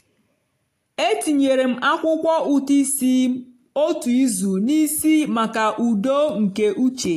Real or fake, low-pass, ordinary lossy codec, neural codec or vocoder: real; 14.4 kHz; AAC, 64 kbps; none